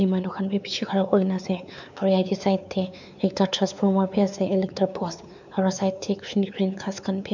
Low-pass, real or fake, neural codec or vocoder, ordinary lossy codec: 7.2 kHz; fake; codec, 16 kHz, 4 kbps, X-Codec, WavLM features, trained on Multilingual LibriSpeech; none